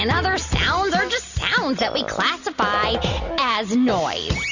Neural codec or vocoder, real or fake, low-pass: none; real; 7.2 kHz